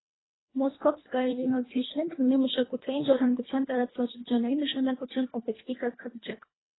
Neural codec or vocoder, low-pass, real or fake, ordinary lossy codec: codec, 24 kHz, 1.5 kbps, HILCodec; 7.2 kHz; fake; AAC, 16 kbps